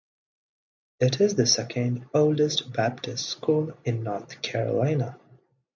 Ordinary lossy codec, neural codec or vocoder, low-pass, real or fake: MP3, 64 kbps; none; 7.2 kHz; real